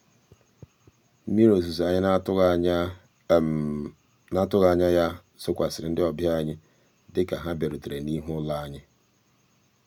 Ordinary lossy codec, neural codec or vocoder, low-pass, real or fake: none; none; 19.8 kHz; real